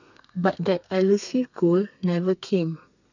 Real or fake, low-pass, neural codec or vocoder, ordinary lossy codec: fake; 7.2 kHz; codec, 32 kHz, 1.9 kbps, SNAC; none